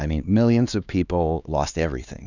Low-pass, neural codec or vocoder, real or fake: 7.2 kHz; codec, 16 kHz, 2 kbps, X-Codec, HuBERT features, trained on LibriSpeech; fake